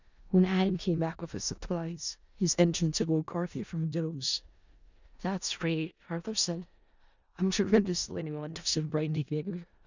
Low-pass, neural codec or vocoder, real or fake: 7.2 kHz; codec, 16 kHz in and 24 kHz out, 0.4 kbps, LongCat-Audio-Codec, four codebook decoder; fake